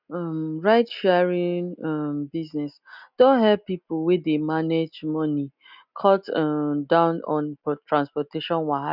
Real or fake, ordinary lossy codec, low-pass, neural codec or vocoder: real; none; 5.4 kHz; none